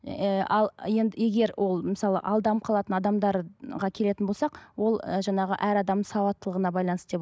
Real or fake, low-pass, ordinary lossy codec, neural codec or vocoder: real; none; none; none